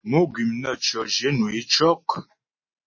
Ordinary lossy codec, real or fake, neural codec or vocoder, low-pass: MP3, 32 kbps; real; none; 7.2 kHz